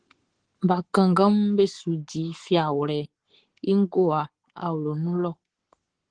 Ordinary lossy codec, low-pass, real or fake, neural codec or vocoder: Opus, 16 kbps; 9.9 kHz; fake; codec, 44.1 kHz, 7.8 kbps, Pupu-Codec